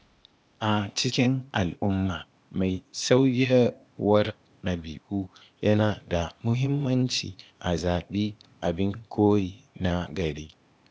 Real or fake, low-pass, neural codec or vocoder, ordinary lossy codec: fake; none; codec, 16 kHz, 0.8 kbps, ZipCodec; none